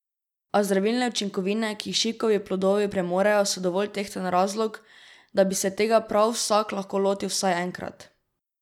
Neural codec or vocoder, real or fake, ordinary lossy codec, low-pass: none; real; none; 19.8 kHz